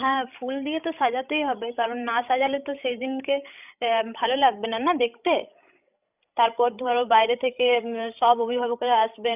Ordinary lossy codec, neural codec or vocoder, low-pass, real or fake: none; codec, 16 kHz, 16 kbps, FreqCodec, larger model; 3.6 kHz; fake